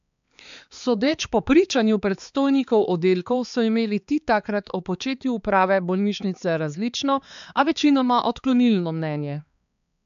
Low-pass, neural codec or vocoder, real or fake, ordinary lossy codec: 7.2 kHz; codec, 16 kHz, 4 kbps, X-Codec, HuBERT features, trained on balanced general audio; fake; AAC, 96 kbps